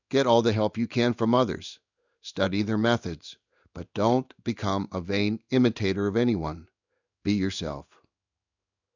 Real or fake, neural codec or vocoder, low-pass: fake; codec, 16 kHz in and 24 kHz out, 1 kbps, XY-Tokenizer; 7.2 kHz